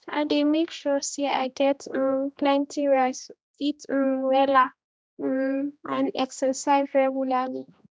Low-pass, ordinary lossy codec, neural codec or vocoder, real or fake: none; none; codec, 16 kHz, 1 kbps, X-Codec, HuBERT features, trained on general audio; fake